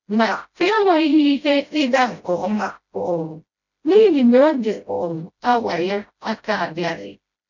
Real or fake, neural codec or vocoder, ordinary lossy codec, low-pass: fake; codec, 16 kHz, 0.5 kbps, FreqCodec, smaller model; none; 7.2 kHz